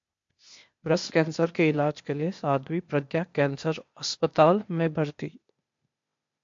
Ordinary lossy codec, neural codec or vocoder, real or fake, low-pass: MP3, 48 kbps; codec, 16 kHz, 0.8 kbps, ZipCodec; fake; 7.2 kHz